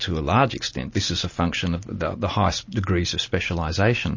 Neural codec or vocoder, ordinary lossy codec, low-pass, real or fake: none; MP3, 32 kbps; 7.2 kHz; real